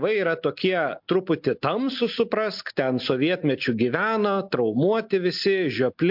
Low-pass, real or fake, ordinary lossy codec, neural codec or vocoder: 5.4 kHz; real; MP3, 48 kbps; none